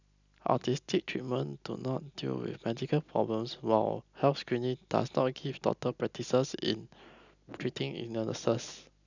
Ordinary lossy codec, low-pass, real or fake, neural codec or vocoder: none; 7.2 kHz; real; none